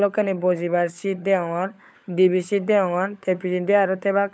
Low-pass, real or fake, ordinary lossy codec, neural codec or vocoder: none; fake; none; codec, 16 kHz, 16 kbps, FunCodec, trained on LibriTTS, 50 frames a second